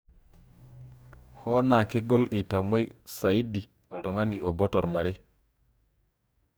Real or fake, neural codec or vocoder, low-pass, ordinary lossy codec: fake; codec, 44.1 kHz, 2.6 kbps, DAC; none; none